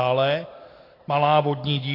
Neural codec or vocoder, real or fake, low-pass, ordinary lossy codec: none; real; 5.4 kHz; AAC, 24 kbps